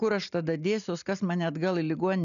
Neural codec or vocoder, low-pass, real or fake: none; 7.2 kHz; real